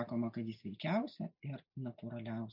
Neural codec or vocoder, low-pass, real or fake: none; 5.4 kHz; real